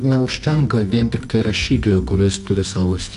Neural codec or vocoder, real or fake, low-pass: codec, 24 kHz, 0.9 kbps, WavTokenizer, medium music audio release; fake; 10.8 kHz